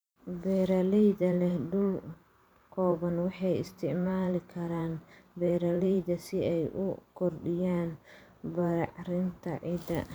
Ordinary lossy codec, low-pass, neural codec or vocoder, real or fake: none; none; vocoder, 44.1 kHz, 128 mel bands every 512 samples, BigVGAN v2; fake